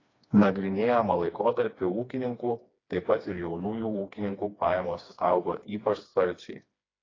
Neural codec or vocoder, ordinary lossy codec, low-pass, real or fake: codec, 16 kHz, 2 kbps, FreqCodec, smaller model; AAC, 32 kbps; 7.2 kHz; fake